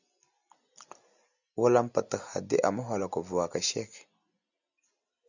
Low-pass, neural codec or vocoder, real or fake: 7.2 kHz; none; real